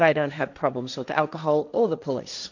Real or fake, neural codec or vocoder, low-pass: fake; codec, 16 kHz, 1.1 kbps, Voila-Tokenizer; 7.2 kHz